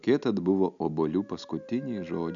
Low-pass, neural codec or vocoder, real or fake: 7.2 kHz; none; real